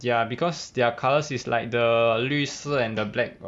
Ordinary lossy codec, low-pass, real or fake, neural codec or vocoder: none; none; real; none